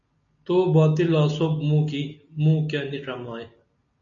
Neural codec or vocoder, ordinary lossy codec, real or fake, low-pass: none; MP3, 96 kbps; real; 7.2 kHz